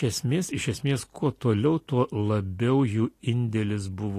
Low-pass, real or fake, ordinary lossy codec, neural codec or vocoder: 14.4 kHz; real; AAC, 48 kbps; none